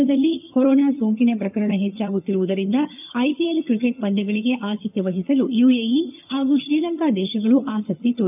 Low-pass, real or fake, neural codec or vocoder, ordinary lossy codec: 3.6 kHz; fake; vocoder, 22.05 kHz, 80 mel bands, HiFi-GAN; none